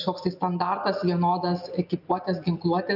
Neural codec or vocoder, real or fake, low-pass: none; real; 5.4 kHz